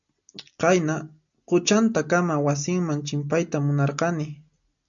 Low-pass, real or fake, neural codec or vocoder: 7.2 kHz; real; none